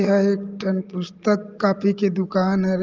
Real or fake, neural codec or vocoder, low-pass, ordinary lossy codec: real; none; 7.2 kHz; Opus, 24 kbps